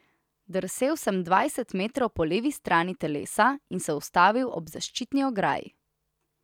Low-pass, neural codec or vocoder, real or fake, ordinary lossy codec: 19.8 kHz; none; real; none